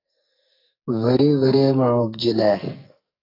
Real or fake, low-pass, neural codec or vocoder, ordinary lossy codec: fake; 5.4 kHz; codec, 44.1 kHz, 2.6 kbps, SNAC; AAC, 32 kbps